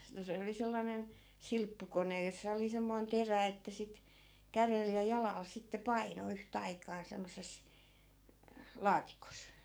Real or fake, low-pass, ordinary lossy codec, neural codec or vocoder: fake; none; none; codec, 44.1 kHz, 7.8 kbps, DAC